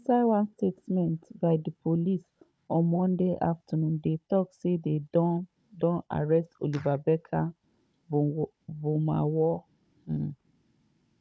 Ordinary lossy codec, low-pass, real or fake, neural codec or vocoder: none; none; fake; codec, 16 kHz, 16 kbps, FunCodec, trained on Chinese and English, 50 frames a second